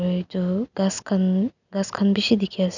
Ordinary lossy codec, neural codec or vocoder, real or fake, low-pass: none; none; real; 7.2 kHz